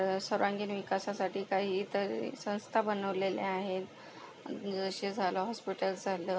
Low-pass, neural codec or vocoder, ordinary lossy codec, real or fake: none; none; none; real